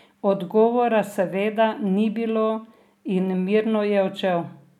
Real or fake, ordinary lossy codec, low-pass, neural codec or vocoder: real; none; 19.8 kHz; none